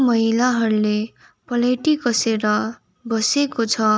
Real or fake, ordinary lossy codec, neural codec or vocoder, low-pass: real; none; none; none